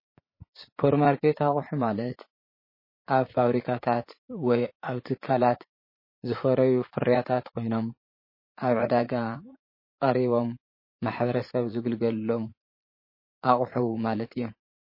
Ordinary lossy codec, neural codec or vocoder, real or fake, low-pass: MP3, 24 kbps; vocoder, 44.1 kHz, 80 mel bands, Vocos; fake; 5.4 kHz